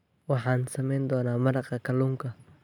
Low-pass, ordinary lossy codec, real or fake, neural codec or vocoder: 19.8 kHz; none; real; none